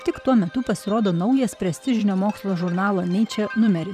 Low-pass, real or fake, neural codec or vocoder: 14.4 kHz; fake; vocoder, 44.1 kHz, 128 mel bands every 512 samples, BigVGAN v2